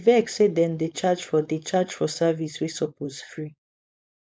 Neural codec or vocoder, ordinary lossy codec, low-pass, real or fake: codec, 16 kHz, 4.8 kbps, FACodec; none; none; fake